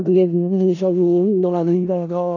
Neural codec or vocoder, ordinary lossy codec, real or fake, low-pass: codec, 16 kHz in and 24 kHz out, 0.4 kbps, LongCat-Audio-Codec, four codebook decoder; none; fake; 7.2 kHz